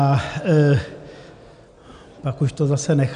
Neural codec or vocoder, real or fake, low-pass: none; real; 9.9 kHz